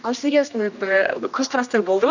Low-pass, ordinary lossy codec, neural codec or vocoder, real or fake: 7.2 kHz; none; codec, 16 kHz, 1 kbps, X-Codec, HuBERT features, trained on general audio; fake